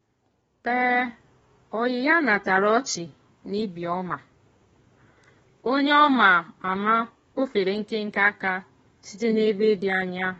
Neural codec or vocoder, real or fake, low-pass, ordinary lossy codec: codec, 32 kHz, 1.9 kbps, SNAC; fake; 14.4 kHz; AAC, 24 kbps